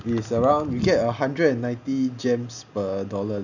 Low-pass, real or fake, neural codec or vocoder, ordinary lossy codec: 7.2 kHz; real; none; none